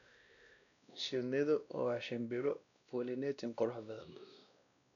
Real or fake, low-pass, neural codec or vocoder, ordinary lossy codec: fake; 7.2 kHz; codec, 16 kHz, 1 kbps, X-Codec, WavLM features, trained on Multilingual LibriSpeech; none